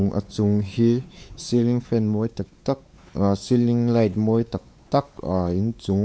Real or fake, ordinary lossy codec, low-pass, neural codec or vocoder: fake; none; none; codec, 16 kHz, 4 kbps, X-Codec, WavLM features, trained on Multilingual LibriSpeech